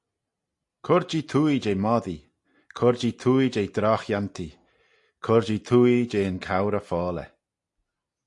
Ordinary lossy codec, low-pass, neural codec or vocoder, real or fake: AAC, 64 kbps; 10.8 kHz; none; real